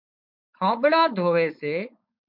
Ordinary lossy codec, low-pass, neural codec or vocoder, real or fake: MP3, 48 kbps; 5.4 kHz; codec, 16 kHz, 4.8 kbps, FACodec; fake